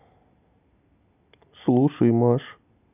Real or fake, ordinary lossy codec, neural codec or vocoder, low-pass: real; none; none; 3.6 kHz